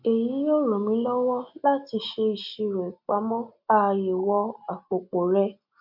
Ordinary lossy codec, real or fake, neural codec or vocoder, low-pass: none; real; none; 5.4 kHz